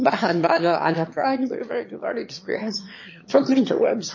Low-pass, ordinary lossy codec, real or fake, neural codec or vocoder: 7.2 kHz; MP3, 32 kbps; fake; autoencoder, 22.05 kHz, a latent of 192 numbers a frame, VITS, trained on one speaker